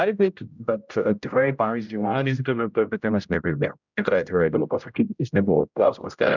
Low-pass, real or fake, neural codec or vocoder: 7.2 kHz; fake; codec, 16 kHz, 0.5 kbps, X-Codec, HuBERT features, trained on general audio